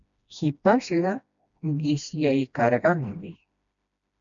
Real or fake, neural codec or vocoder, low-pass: fake; codec, 16 kHz, 1 kbps, FreqCodec, smaller model; 7.2 kHz